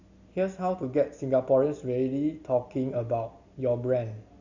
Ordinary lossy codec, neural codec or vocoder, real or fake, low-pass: Opus, 64 kbps; none; real; 7.2 kHz